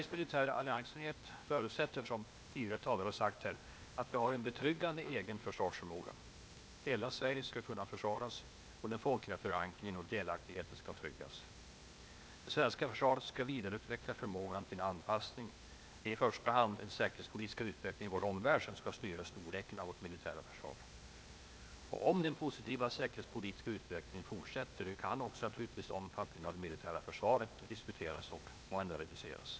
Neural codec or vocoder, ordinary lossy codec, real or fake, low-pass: codec, 16 kHz, 0.8 kbps, ZipCodec; none; fake; none